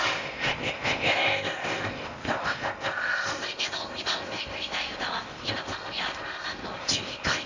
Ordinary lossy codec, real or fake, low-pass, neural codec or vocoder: MP3, 64 kbps; fake; 7.2 kHz; codec, 16 kHz in and 24 kHz out, 0.8 kbps, FocalCodec, streaming, 65536 codes